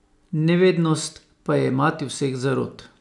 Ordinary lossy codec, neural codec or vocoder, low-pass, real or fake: none; none; 10.8 kHz; real